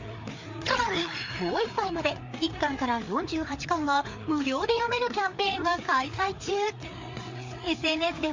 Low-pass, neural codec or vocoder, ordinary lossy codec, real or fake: 7.2 kHz; codec, 16 kHz, 4 kbps, FreqCodec, larger model; MP3, 64 kbps; fake